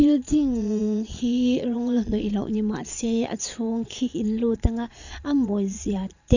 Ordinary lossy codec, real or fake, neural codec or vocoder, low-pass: none; fake; vocoder, 22.05 kHz, 80 mel bands, Vocos; 7.2 kHz